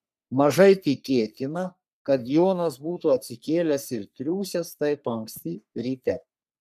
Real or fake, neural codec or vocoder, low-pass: fake; codec, 44.1 kHz, 3.4 kbps, Pupu-Codec; 14.4 kHz